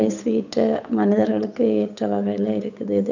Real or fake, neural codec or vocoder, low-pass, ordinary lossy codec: fake; codec, 16 kHz, 8 kbps, FunCodec, trained on Chinese and English, 25 frames a second; 7.2 kHz; none